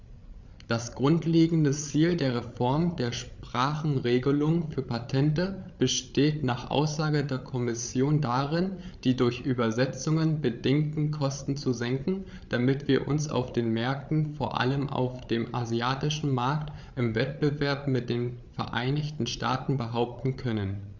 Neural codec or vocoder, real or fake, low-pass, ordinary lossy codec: codec, 16 kHz, 8 kbps, FreqCodec, larger model; fake; 7.2 kHz; Opus, 64 kbps